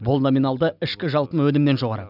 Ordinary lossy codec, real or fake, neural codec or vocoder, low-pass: none; real; none; 5.4 kHz